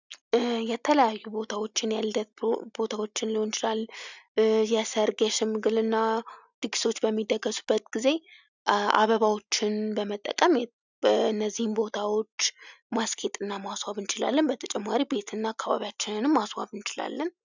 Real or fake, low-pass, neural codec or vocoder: real; 7.2 kHz; none